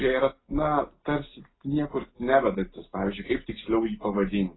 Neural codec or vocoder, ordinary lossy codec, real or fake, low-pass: none; AAC, 16 kbps; real; 7.2 kHz